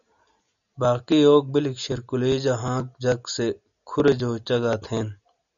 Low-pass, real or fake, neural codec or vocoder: 7.2 kHz; real; none